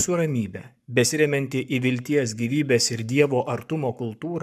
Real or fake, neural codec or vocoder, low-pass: fake; codec, 44.1 kHz, 7.8 kbps, Pupu-Codec; 14.4 kHz